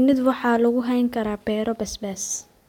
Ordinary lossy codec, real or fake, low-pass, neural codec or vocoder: none; real; 19.8 kHz; none